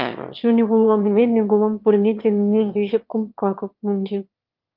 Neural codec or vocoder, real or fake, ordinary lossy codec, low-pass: autoencoder, 22.05 kHz, a latent of 192 numbers a frame, VITS, trained on one speaker; fake; Opus, 24 kbps; 5.4 kHz